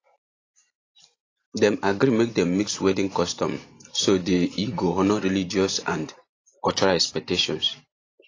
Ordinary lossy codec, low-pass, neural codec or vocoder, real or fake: AAC, 32 kbps; 7.2 kHz; vocoder, 44.1 kHz, 128 mel bands every 512 samples, BigVGAN v2; fake